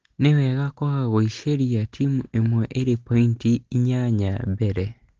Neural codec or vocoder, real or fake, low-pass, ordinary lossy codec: none; real; 7.2 kHz; Opus, 16 kbps